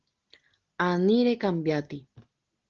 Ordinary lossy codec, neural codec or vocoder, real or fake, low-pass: Opus, 16 kbps; none; real; 7.2 kHz